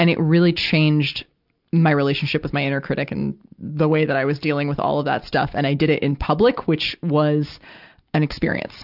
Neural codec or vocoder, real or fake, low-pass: none; real; 5.4 kHz